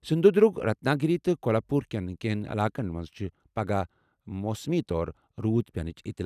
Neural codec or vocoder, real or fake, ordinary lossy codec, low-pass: none; real; none; 14.4 kHz